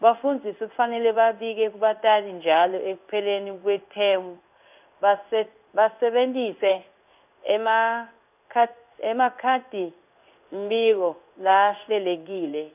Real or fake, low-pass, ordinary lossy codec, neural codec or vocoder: fake; 3.6 kHz; none; codec, 16 kHz in and 24 kHz out, 1 kbps, XY-Tokenizer